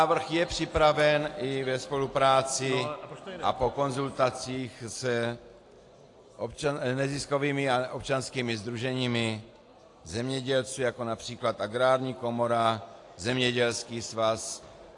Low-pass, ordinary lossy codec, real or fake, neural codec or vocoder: 10.8 kHz; AAC, 48 kbps; real; none